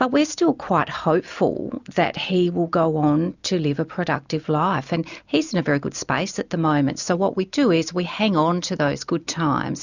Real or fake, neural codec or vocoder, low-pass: real; none; 7.2 kHz